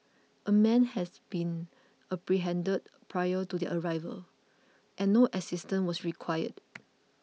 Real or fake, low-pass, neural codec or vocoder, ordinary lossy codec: real; none; none; none